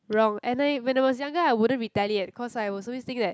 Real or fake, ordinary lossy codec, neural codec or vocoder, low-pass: real; none; none; none